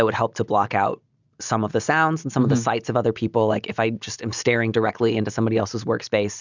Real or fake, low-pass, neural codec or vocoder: real; 7.2 kHz; none